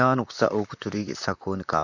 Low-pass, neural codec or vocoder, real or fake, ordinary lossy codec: 7.2 kHz; vocoder, 44.1 kHz, 128 mel bands, Pupu-Vocoder; fake; none